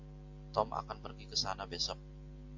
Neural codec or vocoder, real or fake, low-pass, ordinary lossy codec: none; real; 7.2 kHz; AAC, 48 kbps